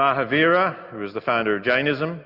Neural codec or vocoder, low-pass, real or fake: none; 5.4 kHz; real